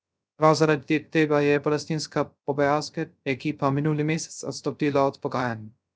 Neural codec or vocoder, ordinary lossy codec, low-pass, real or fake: codec, 16 kHz, 0.3 kbps, FocalCodec; none; none; fake